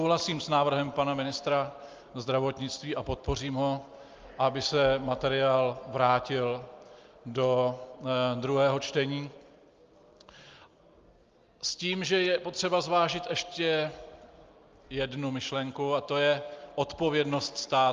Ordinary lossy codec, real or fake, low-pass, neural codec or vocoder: Opus, 32 kbps; real; 7.2 kHz; none